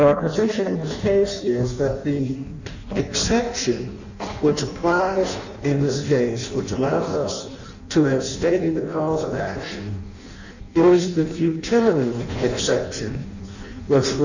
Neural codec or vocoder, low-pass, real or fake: codec, 16 kHz in and 24 kHz out, 0.6 kbps, FireRedTTS-2 codec; 7.2 kHz; fake